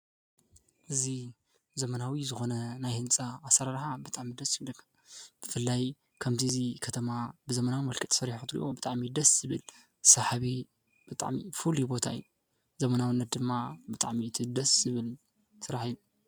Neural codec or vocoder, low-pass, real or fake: none; 19.8 kHz; real